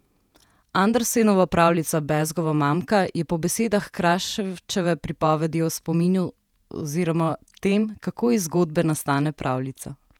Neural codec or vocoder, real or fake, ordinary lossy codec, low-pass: vocoder, 48 kHz, 128 mel bands, Vocos; fake; none; 19.8 kHz